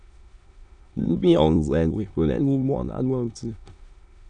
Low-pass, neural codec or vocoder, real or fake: 9.9 kHz; autoencoder, 22.05 kHz, a latent of 192 numbers a frame, VITS, trained on many speakers; fake